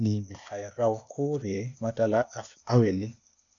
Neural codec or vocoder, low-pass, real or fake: codec, 16 kHz, 0.8 kbps, ZipCodec; 7.2 kHz; fake